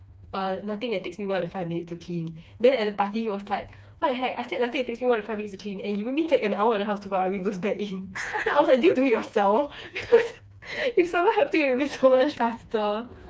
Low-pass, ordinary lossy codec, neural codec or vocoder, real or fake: none; none; codec, 16 kHz, 2 kbps, FreqCodec, smaller model; fake